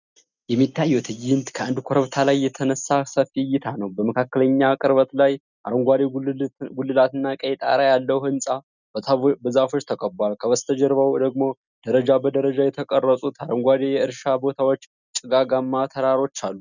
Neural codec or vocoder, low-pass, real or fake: none; 7.2 kHz; real